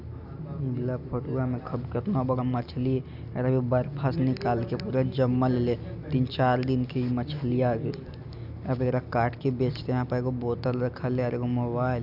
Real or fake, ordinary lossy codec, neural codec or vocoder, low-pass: real; none; none; 5.4 kHz